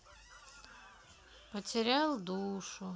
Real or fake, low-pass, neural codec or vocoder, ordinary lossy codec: real; none; none; none